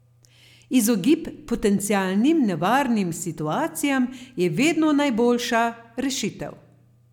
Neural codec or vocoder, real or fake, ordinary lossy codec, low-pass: none; real; none; 19.8 kHz